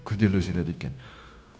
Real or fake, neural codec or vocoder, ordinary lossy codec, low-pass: fake; codec, 16 kHz, 0.9 kbps, LongCat-Audio-Codec; none; none